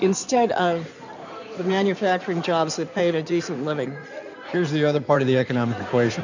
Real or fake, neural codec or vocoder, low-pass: fake; codec, 16 kHz in and 24 kHz out, 2.2 kbps, FireRedTTS-2 codec; 7.2 kHz